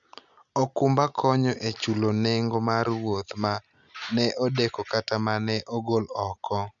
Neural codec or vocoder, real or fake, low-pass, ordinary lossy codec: none; real; 7.2 kHz; MP3, 96 kbps